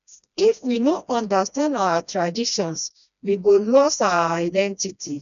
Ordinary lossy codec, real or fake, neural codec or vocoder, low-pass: none; fake; codec, 16 kHz, 1 kbps, FreqCodec, smaller model; 7.2 kHz